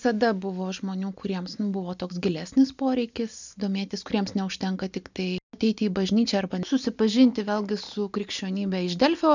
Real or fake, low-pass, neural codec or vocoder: real; 7.2 kHz; none